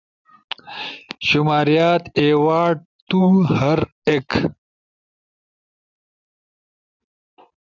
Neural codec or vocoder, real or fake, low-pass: none; real; 7.2 kHz